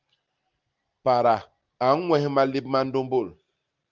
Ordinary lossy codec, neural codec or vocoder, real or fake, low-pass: Opus, 32 kbps; none; real; 7.2 kHz